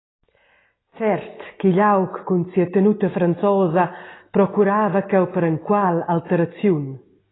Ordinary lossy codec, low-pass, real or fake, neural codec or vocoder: AAC, 16 kbps; 7.2 kHz; real; none